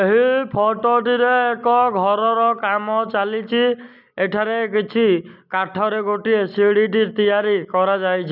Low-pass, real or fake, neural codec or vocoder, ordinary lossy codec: 5.4 kHz; real; none; none